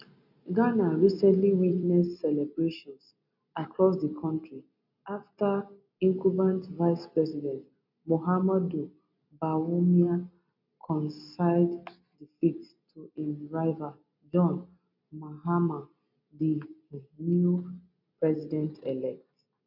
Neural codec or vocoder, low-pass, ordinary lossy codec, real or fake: none; 5.4 kHz; none; real